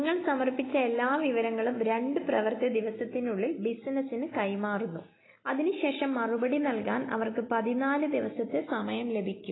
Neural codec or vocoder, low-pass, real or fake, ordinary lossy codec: none; 7.2 kHz; real; AAC, 16 kbps